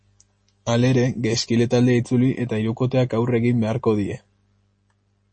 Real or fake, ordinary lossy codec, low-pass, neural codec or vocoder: real; MP3, 32 kbps; 9.9 kHz; none